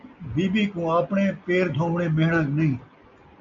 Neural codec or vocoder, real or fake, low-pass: none; real; 7.2 kHz